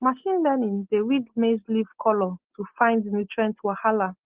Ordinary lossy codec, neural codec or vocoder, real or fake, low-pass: Opus, 16 kbps; none; real; 3.6 kHz